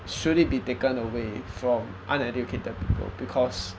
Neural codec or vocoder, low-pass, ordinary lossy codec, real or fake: none; none; none; real